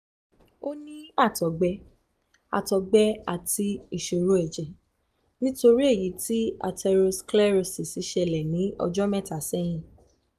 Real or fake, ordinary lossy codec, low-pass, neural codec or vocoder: real; none; 14.4 kHz; none